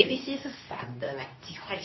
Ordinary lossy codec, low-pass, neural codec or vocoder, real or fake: MP3, 24 kbps; 7.2 kHz; codec, 24 kHz, 0.9 kbps, WavTokenizer, medium speech release version 2; fake